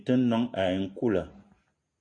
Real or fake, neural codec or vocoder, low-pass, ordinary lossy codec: real; none; 9.9 kHz; AAC, 48 kbps